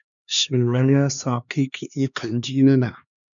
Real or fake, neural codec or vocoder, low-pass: fake; codec, 16 kHz, 2 kbps, X-Codec, HuBERT features, trained on LibriSpeech; 7.2 kHz